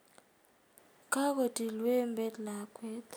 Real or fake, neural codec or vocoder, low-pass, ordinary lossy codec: real; none; none; none